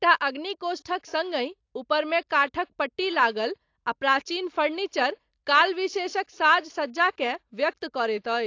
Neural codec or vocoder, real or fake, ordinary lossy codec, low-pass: none; real; AAC, 48 kbps; 7.2 kHz